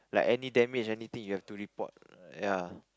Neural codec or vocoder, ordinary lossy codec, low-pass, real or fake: none; none; none; real